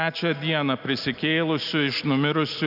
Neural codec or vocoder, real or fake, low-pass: none; real; 5.4 kHz